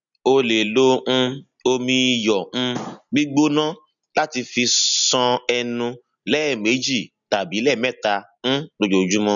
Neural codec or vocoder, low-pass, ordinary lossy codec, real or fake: none; 7.2 kHz; none; real